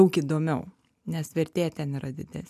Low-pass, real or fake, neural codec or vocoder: 14.4 kHz; real; none